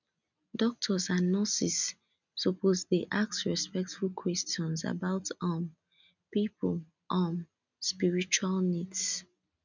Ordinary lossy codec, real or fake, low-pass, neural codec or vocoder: none; real; 7.2 kHz; none